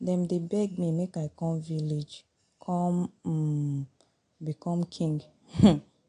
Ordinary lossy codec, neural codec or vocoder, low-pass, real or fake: AAC, 48 kbps; none; 9.9 kHz; real